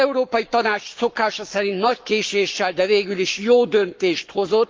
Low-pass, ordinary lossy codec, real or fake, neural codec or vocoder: 7.2 kHz; Opus, 24 kbps; fake; codec, 44.1 kHz, 7.8 kbps, Pupu-Codec